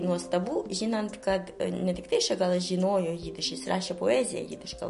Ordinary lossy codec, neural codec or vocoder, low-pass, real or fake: MP3, 48 kbps; none; 10.8 kHz; real